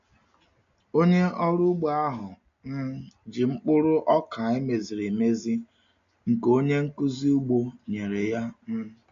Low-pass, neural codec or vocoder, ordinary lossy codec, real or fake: 7.2 kHz; none; MP3, 48 kbps; real